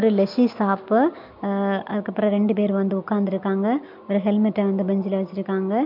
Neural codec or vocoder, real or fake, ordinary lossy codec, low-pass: none; real; MP3, 48 kbps; 5.4 kHz